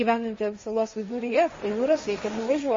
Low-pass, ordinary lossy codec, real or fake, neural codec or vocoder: 7.2 kHz; MP3, 32 kbps; fake; codec, 16 kHz, 1.1 kbps, Voila-Tokenizer